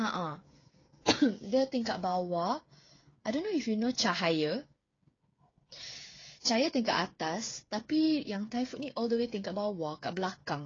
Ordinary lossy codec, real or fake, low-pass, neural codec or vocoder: AAC, 32 kbps; fake; 7.2 kHz; codec, 16 kHz, 8 kbps, FreqCodec, smaller model